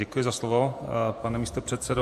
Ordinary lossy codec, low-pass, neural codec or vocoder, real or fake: MP3, 64 kbps; 14.4 kHz; vocoder, 48 kHz, 128 mel bands, Vocos; fake